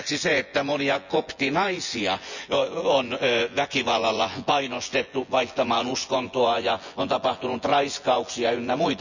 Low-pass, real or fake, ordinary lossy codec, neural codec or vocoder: 7.2 kHz; fake; none; vocoder, 24 kHz, 100 mel bands, Vocos